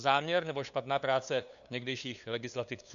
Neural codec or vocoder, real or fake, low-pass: codec, 16 kHz, 2 kbps, FunCodec, trained on LibriTTS, 25 frames a second; fake; 7.2 kHz